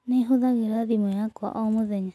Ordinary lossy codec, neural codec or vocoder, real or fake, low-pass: none; none; real; none